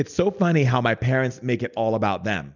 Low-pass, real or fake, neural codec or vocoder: 7.2 kHz; real; none